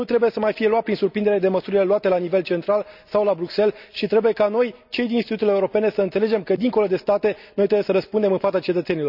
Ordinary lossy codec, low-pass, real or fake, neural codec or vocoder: none; 5.4 kHz; real; none